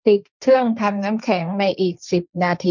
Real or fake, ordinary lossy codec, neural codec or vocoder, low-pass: fake; none; codec, 16 kHz, 1.1 kbps, Voila-Tokenizer; 7.2 kHz